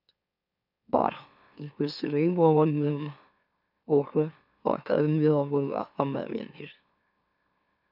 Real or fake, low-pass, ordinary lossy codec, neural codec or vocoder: fake; 5.4 kHz; none; autoencoder, 44.1 kHz, a latent of 192 numbers a frame, MeloTTS